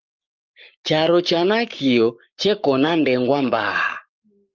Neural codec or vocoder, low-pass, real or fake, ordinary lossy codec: codec, 44.1 kHz, 7.8 kbps, Pupu-Codec; 7.2 kHz; fake; Opus, 32 kbps